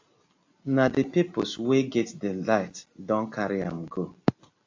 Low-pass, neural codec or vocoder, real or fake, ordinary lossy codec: 7.2 kHz; none; real; AAC, 48 kbps